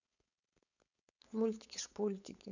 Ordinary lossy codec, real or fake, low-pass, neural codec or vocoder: none; fake; 7.2 kHz; codec, 16 kHz, 4.8 kbps, FACodec